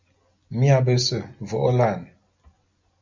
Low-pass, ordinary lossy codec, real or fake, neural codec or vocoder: 7.2 kHz; MP3, 64 kbps; real; none